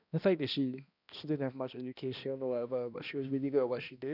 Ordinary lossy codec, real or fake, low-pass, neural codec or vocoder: none; fake; 5.4 kHz; codec, 16 kHz, 1 kbps, X-Codec, HuBERT features, trained on balanced general audio